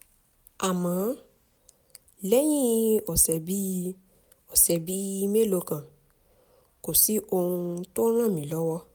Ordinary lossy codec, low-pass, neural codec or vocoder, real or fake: none; none; none; real